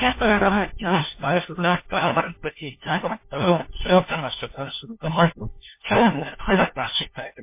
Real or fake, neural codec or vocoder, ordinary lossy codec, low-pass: fake; codec, 16 kHz, 1 kbps, FunCodec, trained on LibriTTS, 50 frames a second; MP3, 24 kbps; 3.6 kHz